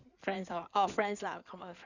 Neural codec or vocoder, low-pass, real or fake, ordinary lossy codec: codec, 16 kHz in and 24 kHz out, 2.2 kbps, FireRedTTS-2 codec; 7.2 kHz; fake; none